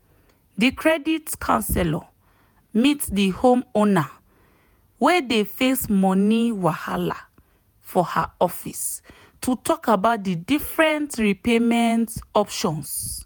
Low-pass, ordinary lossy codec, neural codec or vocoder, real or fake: none; none; vocoder, 48 kHz, 128 mel bands, Vocos; fake